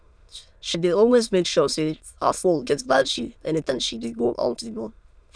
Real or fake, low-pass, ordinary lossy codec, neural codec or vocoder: fake; 9.9 kHz; none; autoencoder, 22.05 kHz, a latent of 192 numbers a frame, VITS, trained on many speakers